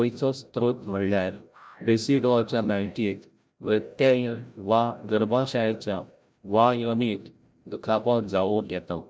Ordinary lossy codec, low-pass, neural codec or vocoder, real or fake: none; none; codec, 16 kHz, 0.5 kbps, FreqCodec, larger model; fake